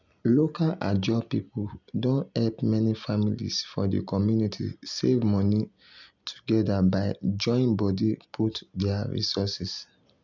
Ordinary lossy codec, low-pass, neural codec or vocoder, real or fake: none; 7.2 kHz; none; real